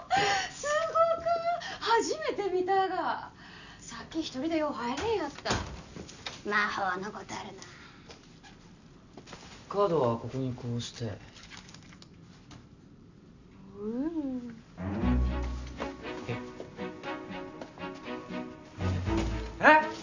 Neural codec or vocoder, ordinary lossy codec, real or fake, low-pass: none; none; real; 7.2 kHz